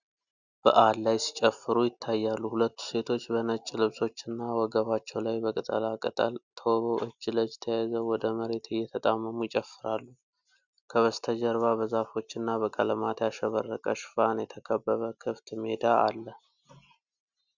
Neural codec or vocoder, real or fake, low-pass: none; real; 7.2 kHz